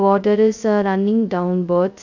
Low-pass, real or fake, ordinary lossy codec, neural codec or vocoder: 7.2 kHz; fake; none; codec, 16 kHz, 0.2 kbps, FocalCodec